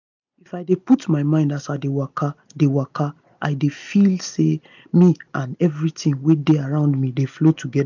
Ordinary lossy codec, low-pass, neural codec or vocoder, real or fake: none; 7.2 kHz; none; real